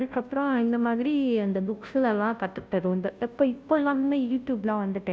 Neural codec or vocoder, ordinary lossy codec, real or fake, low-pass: codec, 16 kHz, 0.5 kbps, FunCodec, trained on Chinese and English, 25 frames a second; none; fake; none